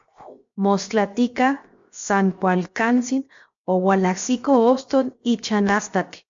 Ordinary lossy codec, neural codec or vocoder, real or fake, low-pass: MP3, 48 kbps; codec, 16 kHz, 0.7 kbps, FocalCodec; fake; 7.2 kHz